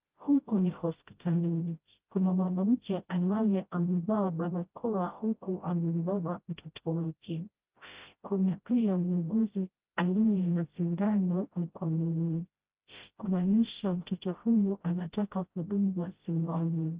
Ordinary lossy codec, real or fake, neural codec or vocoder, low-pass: Opus, 32 kbps; fake; codec, 16 kHz, 0.5 kbps, FreqCodec, smaller model; 3.6 kHz